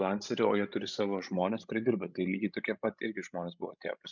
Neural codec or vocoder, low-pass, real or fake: codec, 16 kHz, 16 kbps, FreqCodec, larger model; 7.2 kHz; fake